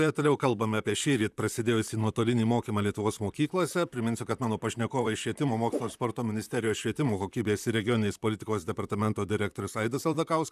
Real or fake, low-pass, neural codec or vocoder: fake; 14.4 kHz; vocoder, 44.1 kHz, 128 mel bands, Pupu-Vocoder